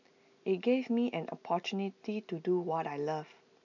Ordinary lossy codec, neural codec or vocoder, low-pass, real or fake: none; none; 7.2 kHz; real